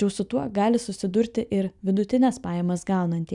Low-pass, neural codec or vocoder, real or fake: 10.8 kHz; none; real